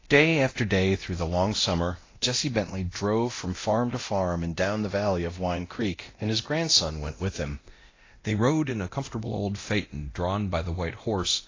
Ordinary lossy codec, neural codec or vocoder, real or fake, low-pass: AAC, 32 kbps; codec, 24 kHz, 0.9 kbps, DualCodec; fake; 7.2 kHz